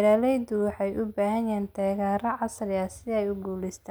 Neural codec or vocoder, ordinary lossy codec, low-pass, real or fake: none; none; none; real